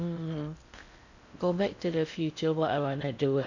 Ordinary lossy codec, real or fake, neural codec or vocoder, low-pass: none; fake; codec, 16 kHz in and 24 kHz out, 0.6 kbps, FocalCodec, streaming, 4096 codes; 7.2 kHz